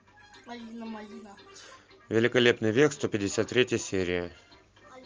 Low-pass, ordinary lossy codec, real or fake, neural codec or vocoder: 7.2 kHz; Opus, 24 kbps; real; none